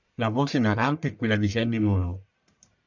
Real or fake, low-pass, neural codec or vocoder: fake; 7.2 kHz; codec, 44.1 kHz, 1.7 kbps, Pupu-Codec